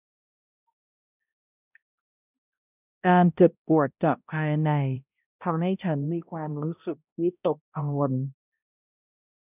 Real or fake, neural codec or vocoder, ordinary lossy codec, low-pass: fake; codec, 16 kHz, 0.5 kbps, X-Codec, HuBERT features, trained on balanced general audio; none; 3.6 kHz